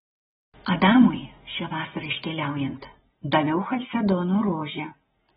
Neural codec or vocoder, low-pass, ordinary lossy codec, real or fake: none; 19.8 kHz; AAC, 16 kbps; real